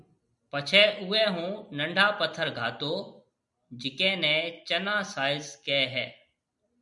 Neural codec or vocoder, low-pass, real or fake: none; 10.8 kHz; real